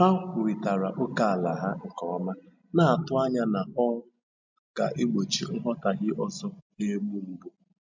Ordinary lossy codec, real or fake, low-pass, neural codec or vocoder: MP3, 64 kbps; real; 7.2 kHz; none